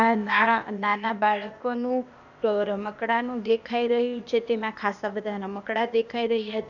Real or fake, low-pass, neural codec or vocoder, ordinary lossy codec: fake; 7.2 kHz; codec, 16 kHz, 0.8 kbps, ZipCodec; none